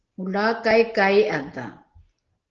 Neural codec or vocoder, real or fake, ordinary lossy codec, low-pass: none; real; Opus, 16 kbps; 7.2 kHz